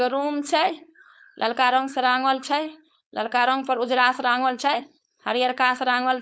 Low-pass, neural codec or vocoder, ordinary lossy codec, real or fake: none; codec, 16 kHz, 4.8 kbps, FACodec; none; fake